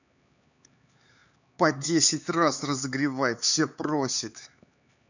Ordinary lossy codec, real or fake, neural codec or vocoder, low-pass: none; fake; codec, 16 kHz, 4 kbps, X-Codec, HuBERT features, trained on LibriSpeech; 7.2 kHz